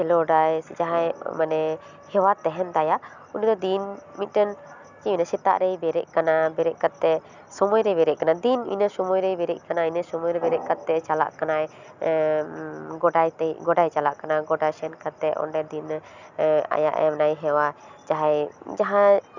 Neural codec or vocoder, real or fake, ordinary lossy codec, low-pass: none; real; none; 7.2 kHz